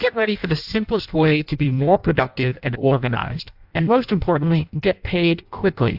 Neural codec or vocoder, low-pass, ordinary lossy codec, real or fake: codec, 16 kHz in and 24 kHz out, 0.6 kbps, FireRedTTS-2 codec; 5.4 kHz; AAC, 48 kbps; fake